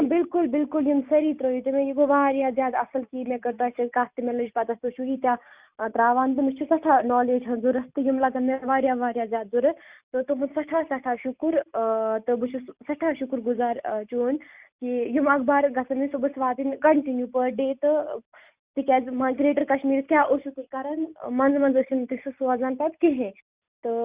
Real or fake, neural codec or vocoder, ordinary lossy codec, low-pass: real; none; Opus, 64 kbps; 3.6 kHz